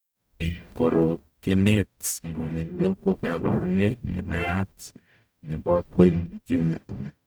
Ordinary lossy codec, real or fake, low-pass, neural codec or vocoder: none; fake; none; codec, 44.1 kHz, 0.9 kbps, DAC